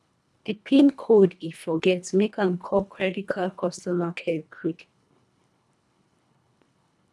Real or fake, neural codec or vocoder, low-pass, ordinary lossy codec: fake; codec, 24 kHz, 1.5 kbps, HILCodec; none; none